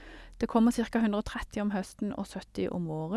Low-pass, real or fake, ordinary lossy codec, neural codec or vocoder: none; real; none; none